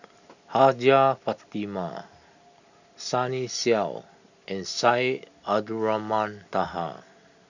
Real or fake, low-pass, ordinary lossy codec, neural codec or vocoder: real; 7.2 kHz; none; none